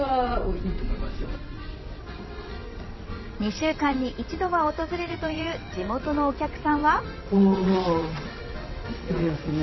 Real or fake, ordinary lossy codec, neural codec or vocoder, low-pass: fake; MP3, 24 kbps; vocoder, 22.05 kHz, 80 mel bands, WaveNeXt; 7.2 kHz